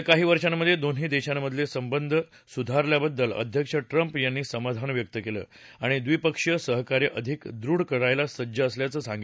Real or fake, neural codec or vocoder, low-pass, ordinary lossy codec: real; none; none; none